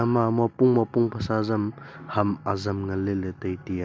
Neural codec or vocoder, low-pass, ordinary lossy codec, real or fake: none; none; none; real